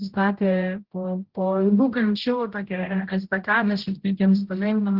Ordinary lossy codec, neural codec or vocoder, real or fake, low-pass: Opus, 16 kbps; codec, 16 kHz, 0.5 kbps, X-Codec, HuBERT features, trained on general audio; fake; 5.4 kHz